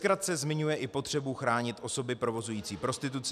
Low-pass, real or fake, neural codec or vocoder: 14.4 kHz; real; none